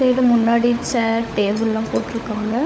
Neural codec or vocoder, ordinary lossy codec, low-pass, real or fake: codec, 16 kHz, 16 kbps, FunCodec, trained on LibriTTS, 50 frames a second; none; none; fake